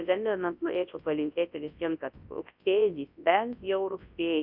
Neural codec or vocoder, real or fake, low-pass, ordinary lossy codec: codec, 24 kHz, 0.9 kbps, WavTokenizer, large speech release; fake; 5.4 kHz; AAC, 48 kbps